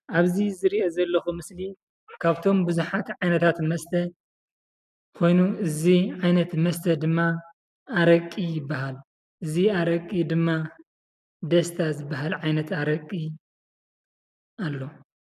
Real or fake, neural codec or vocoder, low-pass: real; none; 14.4 kHz